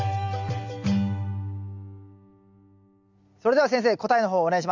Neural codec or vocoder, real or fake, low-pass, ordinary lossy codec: none; real; 7.2 kHz; none